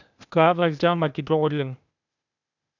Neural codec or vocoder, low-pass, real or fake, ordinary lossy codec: codec, 16 kHz, 0.8 kbps, ZipCodec; 7.2 kHz; fake; none